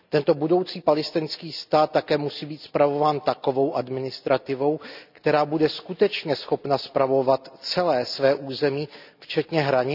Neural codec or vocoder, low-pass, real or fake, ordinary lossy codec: none; 5.4 kHz; real; none